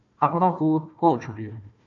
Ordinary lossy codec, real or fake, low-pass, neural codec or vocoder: AAC, 64 kbps; fake; 7.2 kHz; codec, 16 kHz, 1 kbps, FunCodec, trained on Chinese and English, 50 frames a second